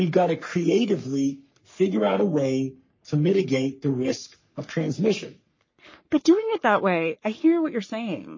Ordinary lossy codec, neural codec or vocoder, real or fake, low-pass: MP3, 32 kbps; codec, 44.1 kHz, 3.4 kbps, Pupu-Codec; fake; 7.2 kHz